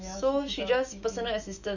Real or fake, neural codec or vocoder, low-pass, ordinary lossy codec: fake; autoencoder, 48 kHz, 128 numbers a frame, DAC-VAE, trained on Japanese speech; 7.2 kHz; none